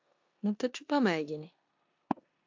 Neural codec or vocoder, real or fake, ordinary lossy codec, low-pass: codec, 16 kHz in and 24 kHz out, 0.9 kbps, LongCat-Audio-Codec, four codebook decoder; fake; AAC, 48 kbps; 7.2 kHz